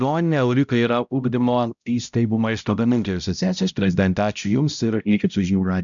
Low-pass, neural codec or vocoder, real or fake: 7.2 kHz; codec, 16 kHz, 0.5 kbps, X-Codec, HuBERT features, trained on balanced general audio; fake